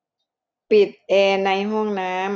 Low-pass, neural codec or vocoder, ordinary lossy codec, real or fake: none; none; none; real